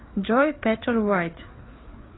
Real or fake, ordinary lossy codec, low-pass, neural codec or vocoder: fake; AAC, 16 kbps; 7.2 kHz; codec, 16 kHz, 4 kbps, FreqCodec, larger model